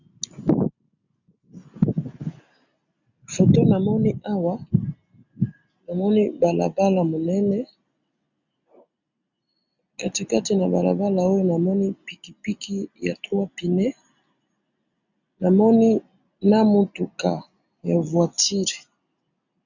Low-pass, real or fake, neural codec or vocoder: 7.2 kHz; real; none